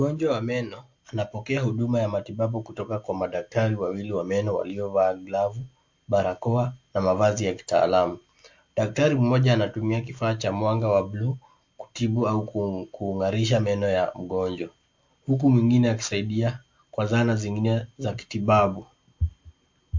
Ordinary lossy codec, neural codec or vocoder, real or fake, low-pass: MP3, 48 kbps; none; real; 7.2 kHz